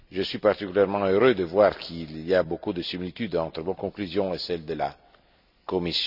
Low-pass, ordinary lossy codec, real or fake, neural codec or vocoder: 5.4 kHz; none; real; none